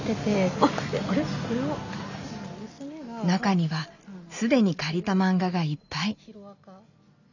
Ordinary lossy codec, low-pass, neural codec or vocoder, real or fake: none; 7.2 kHz; none; real